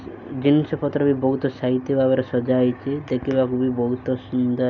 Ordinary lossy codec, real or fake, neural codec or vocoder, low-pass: none; real; none; 7.2 kHz